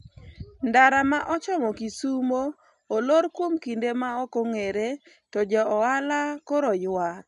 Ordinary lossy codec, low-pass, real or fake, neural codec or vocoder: none; 10.8 kHz; real; none